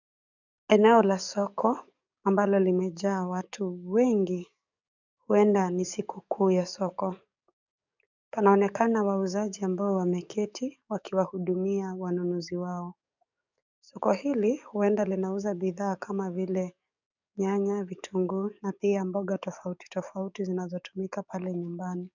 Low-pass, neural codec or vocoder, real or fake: 7.2 kHz; codec, 44.1 kHz, 7.8 kbps, DAC; fake